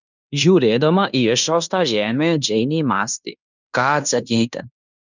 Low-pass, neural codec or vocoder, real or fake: 7.2 kHz; codec, 16 kHz in and 24 kHz out, 0.9 kbps, LongCat-Audio-Codec, fine tuned four codebook decoder; fake